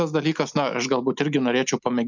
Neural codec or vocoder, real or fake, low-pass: none; real; 7.2 kHz